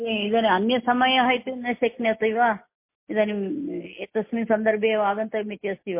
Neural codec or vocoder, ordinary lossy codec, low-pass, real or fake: none; MP3, 24 kbps; 3.6 kHz; real